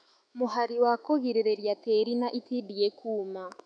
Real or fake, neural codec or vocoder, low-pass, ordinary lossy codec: fake; autoencoder, 48 kHz, 128 numbers a frame, DAC-VAE, trained on Japanese speech; 9.9 kHz; none